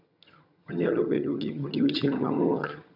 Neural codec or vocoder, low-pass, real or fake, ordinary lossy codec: vocoder, 22.05 kHz, 80 mel bands, HiFi-GAN; 5.4 kHz; fake; none